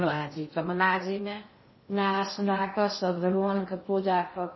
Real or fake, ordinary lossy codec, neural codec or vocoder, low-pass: fake; MP3, 24 kbps; codec, 16 kHz in and 24 kHz out, 0.6 kbps, FocalCodec, streaming, 2048 codes; 7.2 kHz